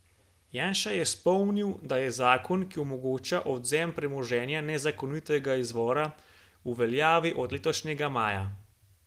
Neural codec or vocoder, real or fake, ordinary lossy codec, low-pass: none; real; Opus, 24 kbps; 14.4 kHz